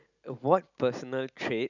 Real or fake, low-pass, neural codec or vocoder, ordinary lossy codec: real; 7.2 kHz; none; none